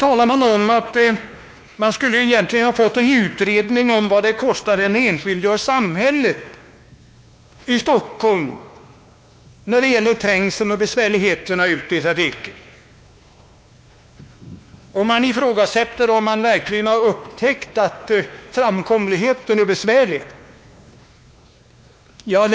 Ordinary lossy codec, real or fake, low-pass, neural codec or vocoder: none; fake; none; codec, 16 kHz, 2 kbps, X-Codec, WavLM features, trained on Multilingual LibriSpeech